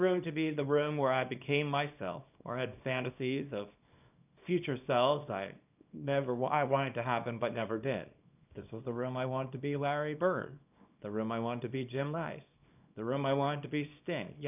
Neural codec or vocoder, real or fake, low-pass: codec, 24 kHz, 0.9 kbps, WavTokenizer, small release; fake; 3.6 kHz